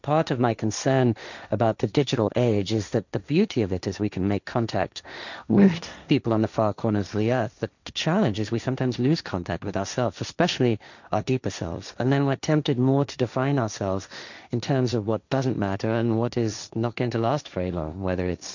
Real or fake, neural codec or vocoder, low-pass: fake; codec, 16 kHz, 1.1 kbps, Voila-Tokenizer; 7.2 kHz